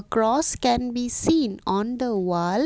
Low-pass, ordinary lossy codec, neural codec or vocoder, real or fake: none; none; none; real